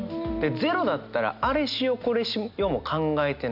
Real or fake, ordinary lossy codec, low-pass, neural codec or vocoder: real; none; 5.4 kHz; none